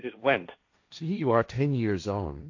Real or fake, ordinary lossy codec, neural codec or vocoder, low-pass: fake; AAC, 48 kbps; codec, 16 kHz, 1.1 kbps, Voila-Tokenizer; 7.2 kHz